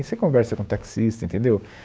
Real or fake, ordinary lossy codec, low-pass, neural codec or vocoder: fake; none; none; codec, 16 kHz, 6 kbps, DAC